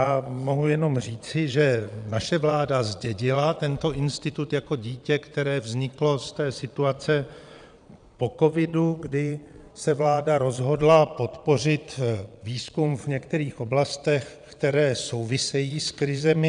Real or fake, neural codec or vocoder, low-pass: fake; vocoder, 22.05 kHz, 80 mel bands, Vocos; 9.9 kHz